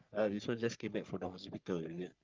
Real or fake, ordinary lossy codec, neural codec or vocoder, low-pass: fake; Opus, 24 kbps; codec, 44.1 kHz, 3.4 kbps, Pupu-Codec; 7.2 kHz